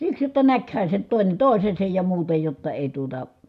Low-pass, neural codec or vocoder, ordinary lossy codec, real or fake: 14.4 kHz; none; none; real